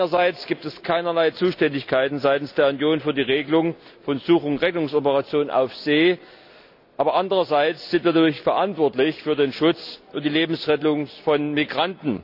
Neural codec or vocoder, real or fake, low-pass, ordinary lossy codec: none; real; 5.4 kHz; AAC, 48 kbps